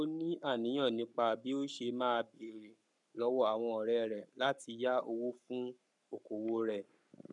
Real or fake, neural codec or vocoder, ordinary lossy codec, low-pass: fake; codec, 44.1 kHz, 7.8 kbps, Pupu-Codec; none; 10.8 kHz